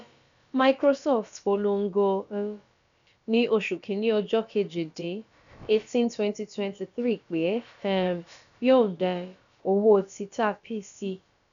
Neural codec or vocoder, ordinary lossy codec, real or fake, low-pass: codec, 16 kHz, about 1 kbps, DyCAST, with the encoder's durations; none; fake; 7.2 kHz